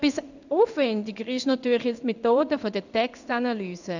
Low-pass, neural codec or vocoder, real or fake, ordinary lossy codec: 7.2 kHz; codec, 16 kHz in and 24 kHz out, 1 kbps, XY-Tokenizer; fake; none